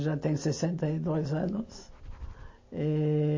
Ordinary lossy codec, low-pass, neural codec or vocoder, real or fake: MP3, 32 kbps; 7.2 kHz; none; real